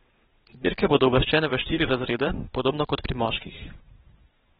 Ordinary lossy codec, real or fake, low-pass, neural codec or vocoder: AAC, 16 kbps; fake; 9.9 kHz; vocoder, 22.05 kHz, 80 mel bands, WaveNeXt